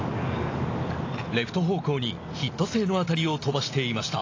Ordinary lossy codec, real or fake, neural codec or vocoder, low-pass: AAC, 32 kbps; real; none; 7.2 kHz